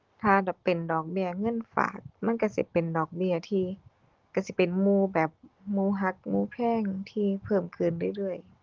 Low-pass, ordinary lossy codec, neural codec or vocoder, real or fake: 7.2 kHz; Opus, 16 kbps; none; real